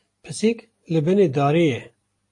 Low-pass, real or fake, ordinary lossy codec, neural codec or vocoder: 10.8 kHz; real; AAC, 48 kbps; none